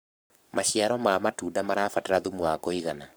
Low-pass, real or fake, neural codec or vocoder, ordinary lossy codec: none; fake; codec, 44.1 kHz, 7.8 kbps, Pupu-Codec; none